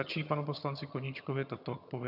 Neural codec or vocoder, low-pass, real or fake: vocoder, 22.05 kHz, 80 mel bands, HiFi-GAN; 5.4 kHz; fake